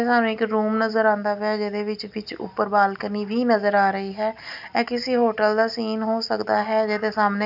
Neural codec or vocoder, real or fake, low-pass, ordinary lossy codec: none; real; 5.4 kHz; none